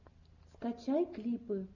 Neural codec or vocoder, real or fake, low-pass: none; real; 7.2 kHz